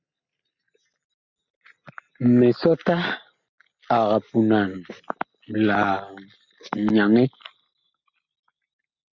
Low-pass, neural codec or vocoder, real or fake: 7.2 kHz; none; real